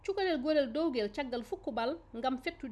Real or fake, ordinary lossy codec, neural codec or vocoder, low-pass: real; none; none; none